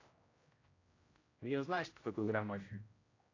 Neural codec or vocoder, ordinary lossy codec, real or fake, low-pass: codec, 16 kHz, 0.5 kbps, X-Codec, HuBERT features, trained on general audio; AAC, 32 kbps; fake; 7.2 kHz